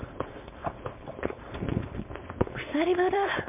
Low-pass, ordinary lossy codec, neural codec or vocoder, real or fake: 3.6 kHz; MP3, 32 kbps; codec, 16 kHz, 4.8 kbps, FACodec; fake